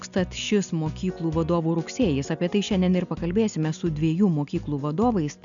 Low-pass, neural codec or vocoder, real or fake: 7.2 kHz; none; real